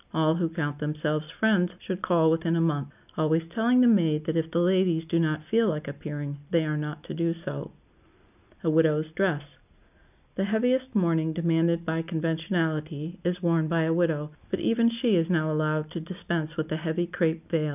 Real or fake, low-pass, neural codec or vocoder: real; 3.6 kHz; none